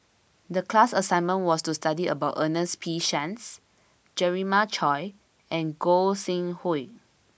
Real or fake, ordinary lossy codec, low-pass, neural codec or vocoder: real; none; none; none